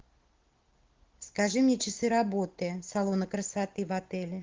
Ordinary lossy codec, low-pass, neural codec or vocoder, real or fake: Opus, 16 kbps; 7.2 kHz; none; real